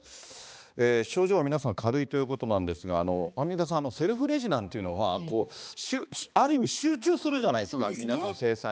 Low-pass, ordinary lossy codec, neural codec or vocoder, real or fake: none; none; codec, 16 kHz, 2 kbps, X-Codec, HuBERT features, trained on balanced general audio; fake